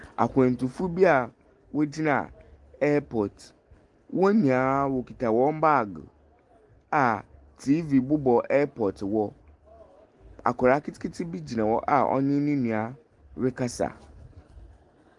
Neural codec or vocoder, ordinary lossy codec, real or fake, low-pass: none; Opus, 32 kbps; real; 10.8 kHz